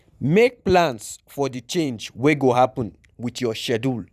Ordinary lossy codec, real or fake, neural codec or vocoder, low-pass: none; fake; vocoder, 44.1 kHz, 128 mel bands every 512 samples, BigVGAN v2; 14.4 kHz